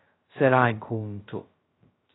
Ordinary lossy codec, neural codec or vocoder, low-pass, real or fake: AAC, 16 kbps; codec, 16 kHz, 0.2 kbps, FocalCodec; 7.2 kHz; fake